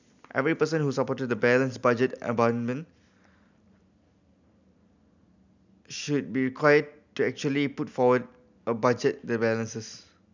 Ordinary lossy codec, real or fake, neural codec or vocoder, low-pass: none; real; none; 7.2 kHz